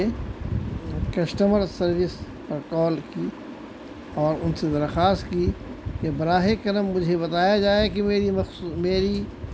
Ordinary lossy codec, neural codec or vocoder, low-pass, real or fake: none; none; none; real